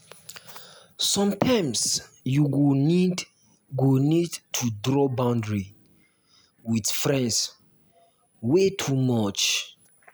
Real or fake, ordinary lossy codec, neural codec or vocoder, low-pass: real; none; none; none